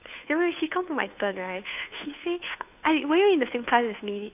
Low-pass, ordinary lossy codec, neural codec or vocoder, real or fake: 3.6 kHz; AAC, 32 kbps; codec, 16 kHz, 2 kbps, FunCodec, trained on Chinese and English, 25 frames a second; fake